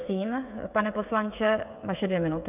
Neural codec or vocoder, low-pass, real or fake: codec, 16 kHz, 16 kbps, FreqCodec, smaller model; 3.6 kHz; fake